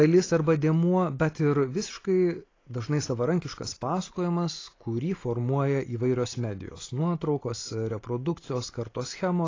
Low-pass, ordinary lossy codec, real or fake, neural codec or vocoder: 7.2 kHz; AAC, 32 kbps; real; none